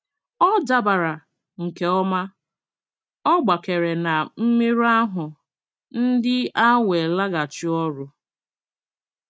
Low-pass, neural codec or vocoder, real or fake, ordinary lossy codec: none; none; real; none